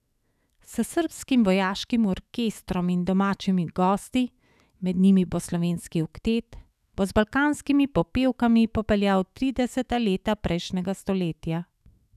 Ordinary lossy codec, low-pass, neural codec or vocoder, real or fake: none; 14.4 kHz; autoencoder, 48 kHz, 128 numbers a frame, DAC-VAE, trained on Japanese speech; fake